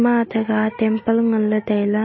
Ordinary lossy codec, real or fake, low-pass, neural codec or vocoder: MP3, 24 kbps; real; 7.2 kHz; none